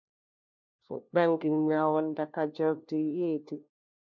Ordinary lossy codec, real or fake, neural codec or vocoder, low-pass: MP3, 64 kbps; fake; codec, 16 kHz, 1 kbps, FunCodec, trained on LibriTTS, 50 frames a second; 7.2 kHz